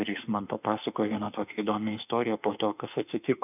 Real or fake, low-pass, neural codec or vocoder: fake; 3.6 kHz; autoencoder, 48 kHz, 32 numbers a frame, DAC-VAE, trained on Japanese speech